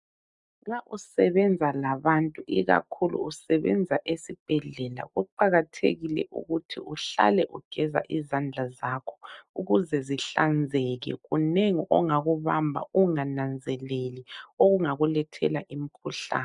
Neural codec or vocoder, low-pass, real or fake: none; 10.8 kHz; real